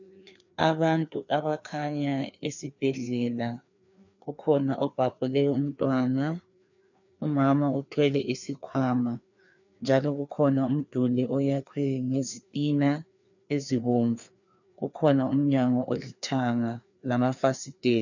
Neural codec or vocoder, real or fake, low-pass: codec, 16 kHz, 2 kbps, FreqCodec, larger model; fake; 7.2 kHz